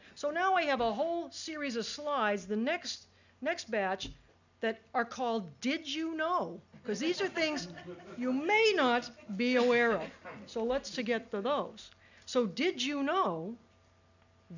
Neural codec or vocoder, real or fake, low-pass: none; real; 7.2 kHz